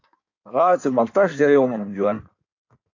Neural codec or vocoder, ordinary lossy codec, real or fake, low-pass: codec, 16 kHz in and 24 kHz out, 1.1 kbps, FireRedTTS-2 codec; AAC, 48 kbps; fake; 7.2 kHz